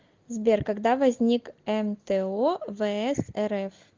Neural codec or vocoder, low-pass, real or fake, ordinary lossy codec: none; 7.2 kHz; real; Opus, 32 kbps